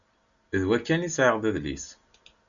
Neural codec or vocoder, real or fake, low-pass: none; real; 7.2 kHz